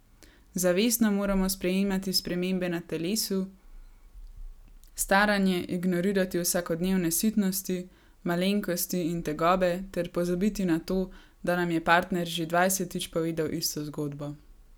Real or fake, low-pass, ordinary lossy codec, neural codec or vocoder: real; none; none; none